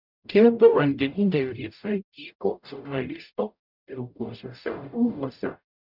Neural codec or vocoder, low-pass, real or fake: codec, 44.1 kHz, 0.9 kbps, DAC; 5.4 kHz; fake